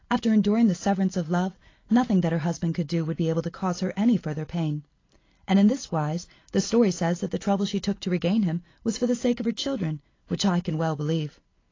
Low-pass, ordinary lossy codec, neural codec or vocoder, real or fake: 7.2 kHz; AAC, 32 kbps; none; real